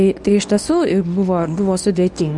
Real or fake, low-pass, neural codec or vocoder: fake; 10.8 kHz; codec, 24 kHz, 0.9 kbps, WavTokenizer, medium speech release version 1